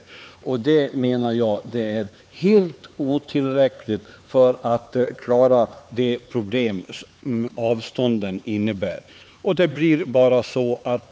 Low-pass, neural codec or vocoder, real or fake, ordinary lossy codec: none; codec, 16 kHz, 4 kbps, X-Codec, HuBERT features, trained on LibriSpeech; fake; none